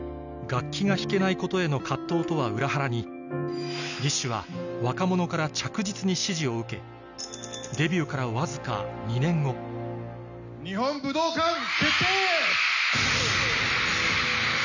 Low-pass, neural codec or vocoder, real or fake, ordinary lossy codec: 7.2 kHz; none; real; none